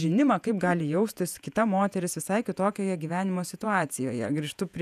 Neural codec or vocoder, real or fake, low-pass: vocoder, 48 kHz, 128 mel bands, Vocos; fake; 14.4 kHz